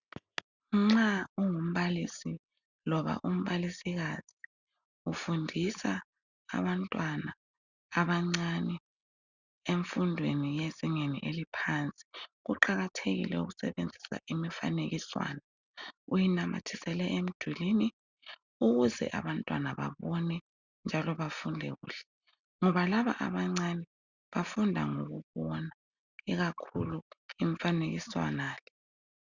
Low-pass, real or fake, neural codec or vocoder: 7.2 kHz; real; none